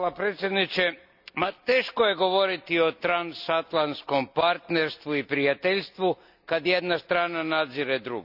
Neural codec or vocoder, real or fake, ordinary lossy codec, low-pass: none; real; none; 5.4 kHz